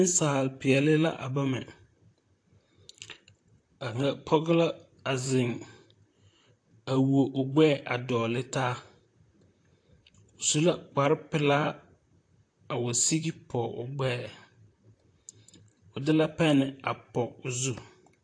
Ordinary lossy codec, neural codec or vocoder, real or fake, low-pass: AAC, 48 kbps; vocoder, 44.1 kHz, 128 mel bands, Pupu-Vocoder; fake; 9.9 kHz